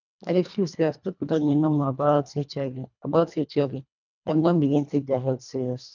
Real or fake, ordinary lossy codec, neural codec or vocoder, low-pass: fake; none; codec, 24 kHz, 1.5 kbps, HILCodec; 7.2 kHz